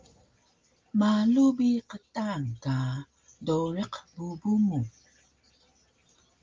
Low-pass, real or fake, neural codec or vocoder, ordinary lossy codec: 7.2 kHz; real; none; Opus, 32 kbps